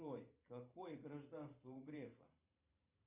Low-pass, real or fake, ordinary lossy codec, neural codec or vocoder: 3.6 kHz; fake; Opus, 64 kbps; vocoder, 44.1 kHz, 128 mel bands every 512 samples, BigVGAN v2